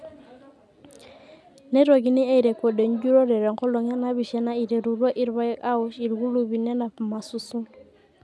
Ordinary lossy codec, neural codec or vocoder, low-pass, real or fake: none; none; none; real